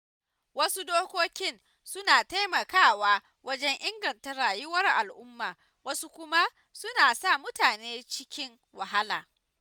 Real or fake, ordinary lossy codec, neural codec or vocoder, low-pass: real; none; none; none